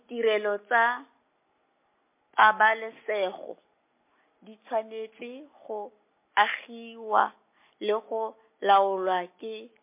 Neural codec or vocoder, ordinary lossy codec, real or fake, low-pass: none; MP3, 24 kbps; real; 3.6 kHz